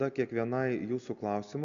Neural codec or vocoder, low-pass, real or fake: none; 7.2 kHz; real